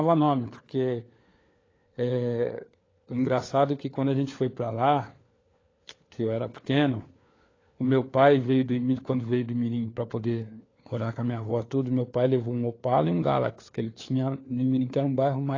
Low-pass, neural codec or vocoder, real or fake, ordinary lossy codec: 7.2 kHz; codec, 16 kHz, 4 kbps, FunCodec, trained on LibriTTS, 50 frames a second; fake; AAC, 32 kbps